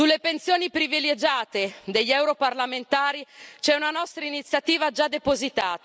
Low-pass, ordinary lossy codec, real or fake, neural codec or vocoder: none; none; real; none